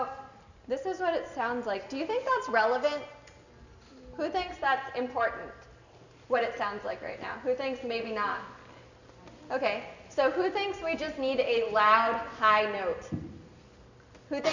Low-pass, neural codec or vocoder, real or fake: 7.2 kHz; none; real